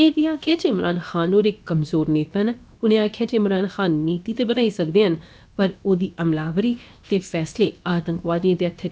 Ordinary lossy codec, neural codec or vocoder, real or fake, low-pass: none; codec, 16 kHz, about 1 kbps, DyCAST, with the encoder's durations; fake; none